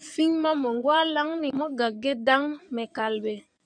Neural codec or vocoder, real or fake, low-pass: codec, 16 kHz in and 24 kHz out, 2.2 kbps, FireRedTTS-2 codec; fake; 9.9 kHz